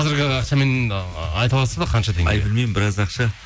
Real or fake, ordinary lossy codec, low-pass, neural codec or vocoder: real; none; none; none